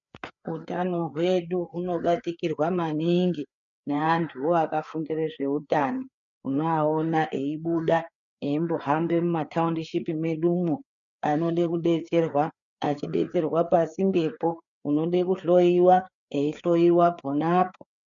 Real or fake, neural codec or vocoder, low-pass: fake; codec, 16 kHz, 4 kbps, FreqCodec, larger model; 7.2 kHz